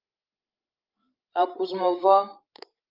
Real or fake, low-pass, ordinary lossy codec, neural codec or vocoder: fake; 5.4 kHz; Opus, 24 kbps; codec, 16 kHz, 16 kbps, FreqCodec, larger model